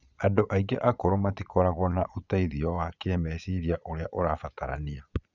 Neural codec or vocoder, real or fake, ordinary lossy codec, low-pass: vocoder, 22.05 kHz, 80 mel bands, Vocos; fake; none; 7.2 kHz